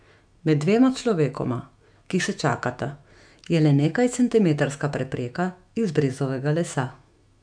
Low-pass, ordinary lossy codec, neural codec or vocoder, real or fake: 9.9 kHz; none; autoencoder, 48 kHz, 128 numbers a frame, DAC-VAE, trained on Japanese speech; fake